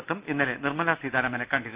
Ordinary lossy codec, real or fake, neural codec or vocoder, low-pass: Opus, 64 kbps; real; none; 3.6 kHz